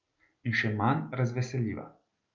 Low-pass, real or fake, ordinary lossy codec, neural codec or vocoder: 7.2 kHz; real; Opus, 24 kbps; none